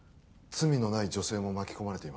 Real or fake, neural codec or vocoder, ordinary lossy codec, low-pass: real; none; none; none